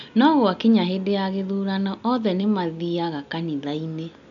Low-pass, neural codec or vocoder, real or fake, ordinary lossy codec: 7.2 kHz; none; real; none